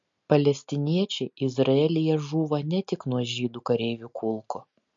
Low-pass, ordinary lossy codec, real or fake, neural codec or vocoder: 7.2 kHz; MP3, 48 kbps; real; none